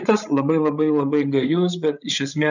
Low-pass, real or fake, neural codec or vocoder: 7.2 kHz; fake; codec, 16 kHz, 8 kbps, FreqCodec, larger model